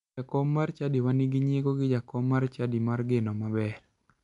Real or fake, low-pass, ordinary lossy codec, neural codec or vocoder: real; 10.8 kHz; none; none